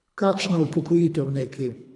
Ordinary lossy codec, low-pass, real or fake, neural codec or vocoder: none; 10.8 kHz; fake; codec, 24 kHz, 3 kbps, HILCodec